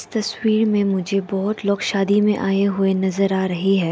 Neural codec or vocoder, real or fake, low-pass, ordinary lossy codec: none; real; none; none